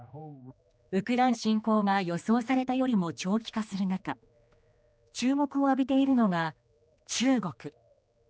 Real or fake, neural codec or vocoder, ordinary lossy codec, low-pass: fake; codec, 16 kHz, 2 kbps, X-Codec, HuBERT features, trained on general audio; none; none